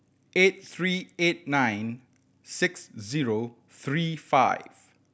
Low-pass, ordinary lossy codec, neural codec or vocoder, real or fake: none; none; none; real